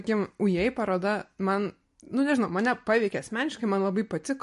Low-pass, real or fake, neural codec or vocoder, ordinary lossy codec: 14.4 kHz; real; none; MP3, 48 kbps